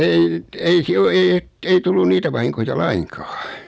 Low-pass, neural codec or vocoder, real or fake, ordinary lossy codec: none; none; real; none